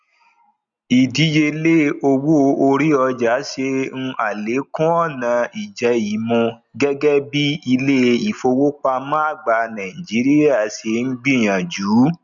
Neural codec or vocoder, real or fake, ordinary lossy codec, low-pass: none; real; none; 7.2 kHz